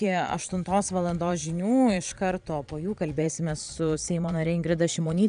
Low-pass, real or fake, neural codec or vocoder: 9.9 kHz; fake; vocoder, 22.05 kHz, 80 mel bands, Vocos